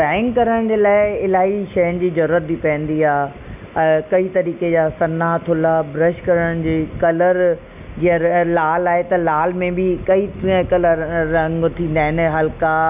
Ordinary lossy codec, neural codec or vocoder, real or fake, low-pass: none; none; real; 3.6 kHz